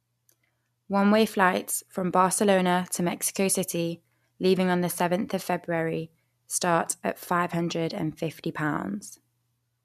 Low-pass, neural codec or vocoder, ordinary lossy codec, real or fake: 14.4 kHz; none; MP3, 96 kbps; real